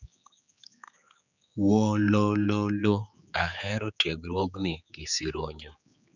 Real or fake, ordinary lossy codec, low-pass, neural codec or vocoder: fake; none; 7.2 kHz; codec, 16 kHz, 4 kbps, X-Codec, HuBERT features, trained on general audio